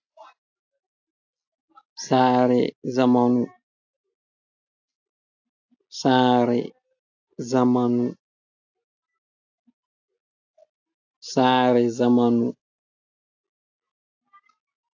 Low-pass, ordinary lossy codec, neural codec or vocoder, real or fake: 7.2 kHz; AAC, 48 kbps; none; real